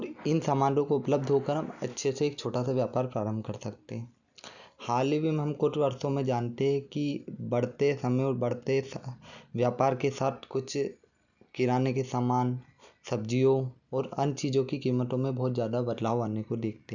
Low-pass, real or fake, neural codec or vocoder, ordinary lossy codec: 7.2 kHz; real; none; none